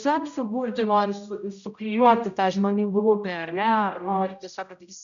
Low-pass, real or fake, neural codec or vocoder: 7.2 kHz; fake; codec, 16 kHz, 0.5 kbps, X-Codec, HuBERT features, trained on general audio